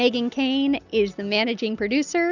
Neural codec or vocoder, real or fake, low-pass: none; real; 7.2 kHz